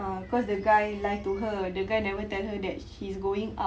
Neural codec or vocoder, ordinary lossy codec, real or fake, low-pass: none; none; real; none